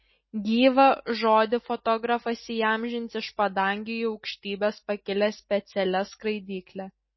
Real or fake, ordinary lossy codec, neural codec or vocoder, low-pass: real; MP3, 24 kbps; none; 7.2 kHz